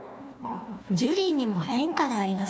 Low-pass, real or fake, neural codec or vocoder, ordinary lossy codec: none; fake; codec, 16 kHz, 1 kbps, FunCodec, trained on Chinese and English, 50 frames a second; none